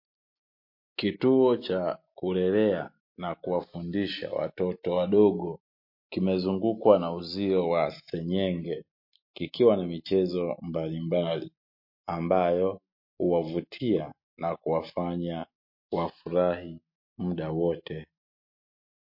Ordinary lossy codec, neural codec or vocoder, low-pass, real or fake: MP3, 32 kbps; none; 5.4 kHz; real